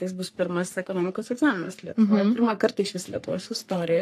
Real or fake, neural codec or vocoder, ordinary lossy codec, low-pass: fake; codec, 44.1 kHz, 3.4 kbps, Pupu-Codec; AAC, 64 kbps; 14.4 kHz